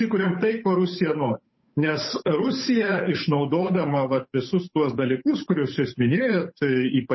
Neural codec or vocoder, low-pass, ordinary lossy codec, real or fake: codec, 16 kHz, 8 kbps, FunCodec, trained on Chinese and English, 25 frames a second; 7.2 kHz; MP3, 24 kbps; fake